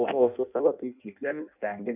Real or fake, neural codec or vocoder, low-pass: fake; codec, 16 kHz in and 24 kHz out, 0.6 kbps, FireRedTTS-2 codec; 3.6 kHz